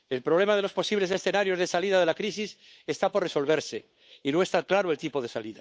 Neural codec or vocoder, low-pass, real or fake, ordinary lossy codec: codec, 16 kHz, 8 kbps, FunCodec, trained on Chinese and English, 25 frames a second; none; fake; none